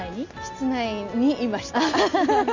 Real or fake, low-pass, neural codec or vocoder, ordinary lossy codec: real; 7.2 kHz; none; none